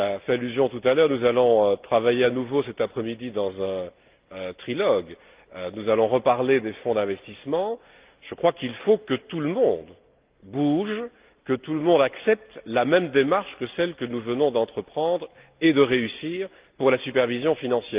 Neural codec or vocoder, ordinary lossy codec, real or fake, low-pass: none; Opus, 32 kbps; real; 3.6 kHz